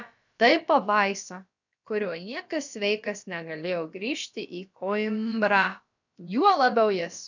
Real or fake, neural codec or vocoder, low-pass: fake; codec, 16 kHz, about 1 kbps, DyCAST, with the encoder's durations; 7.2 kHz